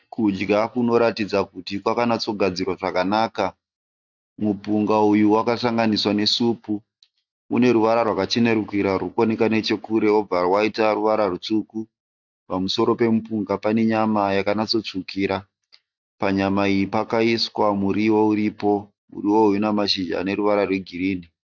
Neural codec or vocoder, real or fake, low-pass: none; real; 7.2 kHz